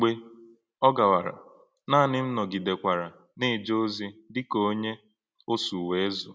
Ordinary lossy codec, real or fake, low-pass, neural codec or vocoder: none; real; none; none